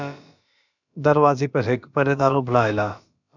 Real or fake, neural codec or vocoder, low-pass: fake; codec, 16 kHz, about 1 kbps, DyCAST, with the encoder's durations; 7.2 kHz